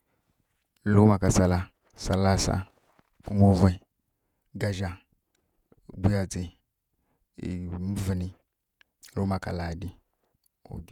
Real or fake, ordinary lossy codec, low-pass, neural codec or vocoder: fake; none; 19.8 kHz; vocoder, 48 kHz, 128 mel bands, Vocos